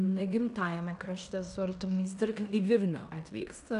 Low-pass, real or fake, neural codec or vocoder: 10.8 kHz; fake; codec, 16 kHz in and 24 kHz out, 0.9 kbps, LongCat-Audio-Codec, fine tuned four codebook decoder